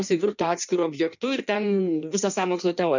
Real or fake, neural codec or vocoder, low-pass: fake; codec, 16 kHz in and 24 kHz out, 1.1 kbps, FireRedTTS-2 codec; 7.2 kHz